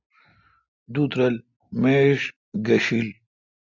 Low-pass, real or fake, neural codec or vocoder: 7.2 kHz; real; none